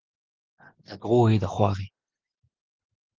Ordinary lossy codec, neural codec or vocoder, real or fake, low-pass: Opus, 16 kbps; none; real; 7.2 kHz